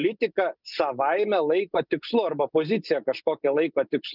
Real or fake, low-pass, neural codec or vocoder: real; 5.4 kHz; none